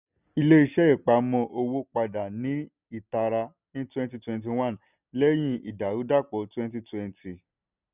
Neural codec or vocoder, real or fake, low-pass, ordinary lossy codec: none; real; 3.6 kHz; none